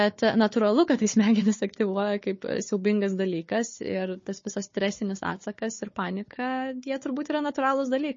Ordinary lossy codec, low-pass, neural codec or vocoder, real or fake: MP3, 32 kbps; 7.2 kHz; codec, 16 kHz, 4 kbps, FunCodec, trained on Chinese and English, 50 frames a second; fake